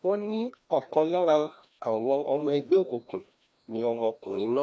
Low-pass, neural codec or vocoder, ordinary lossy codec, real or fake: none; codec, 16 kHz, 1 kbps, FreqCodec, larger model; none; fake